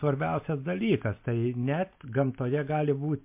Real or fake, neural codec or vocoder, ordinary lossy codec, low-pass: real; none; MP3, 32 kbps; 3.6 kHz